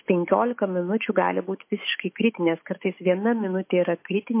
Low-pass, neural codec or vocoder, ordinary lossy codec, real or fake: 3.6 kHz; autoencoder, 48 kHz, 128 numbers a frame, DAC-VAE, trained on Japanese speech; MP3, 24 kbps; fake